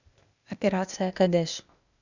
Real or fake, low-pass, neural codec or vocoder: fake; 7.2 kHz; codec, 16 kHz, 0.8 kbps, ZipCodec